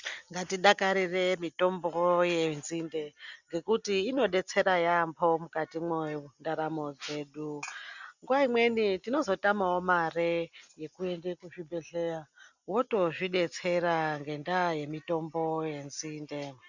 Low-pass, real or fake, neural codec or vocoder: 7.2 kHz; real; none